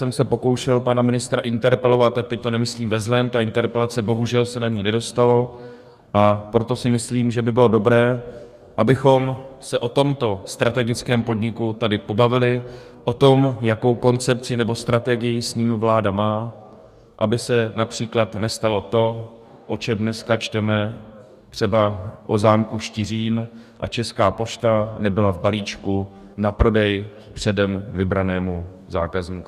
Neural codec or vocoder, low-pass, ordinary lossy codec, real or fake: codec, 44.1 kHz, 2.6 kbps, DAC; 14.4 kHz; Opus, 64 kbps; fake